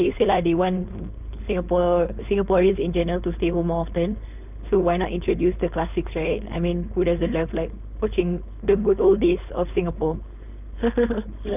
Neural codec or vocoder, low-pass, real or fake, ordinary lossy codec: codec, 16 kHz, 4.8 kbps, FACodec; 3.6 kHz; fake; none